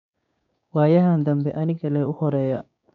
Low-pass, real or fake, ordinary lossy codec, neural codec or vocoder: 7.2 kHz; fake; none; codec, 16 kHz, 6 kbps, DAC